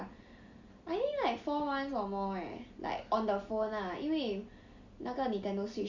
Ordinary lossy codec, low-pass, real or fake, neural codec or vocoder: Opus, 64 kbps; 7.2 kHz; real; none